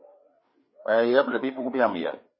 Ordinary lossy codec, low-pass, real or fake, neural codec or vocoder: MP3, 24 kbps; 7.2 kHz; fake; codec, 16 kHz, 4 kbps, FreqCodec, larger model